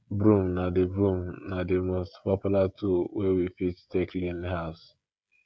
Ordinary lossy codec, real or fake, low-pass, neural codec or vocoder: none; fake; none; codec, 16 kHz, 16 kbps, FreqCodec, smaller model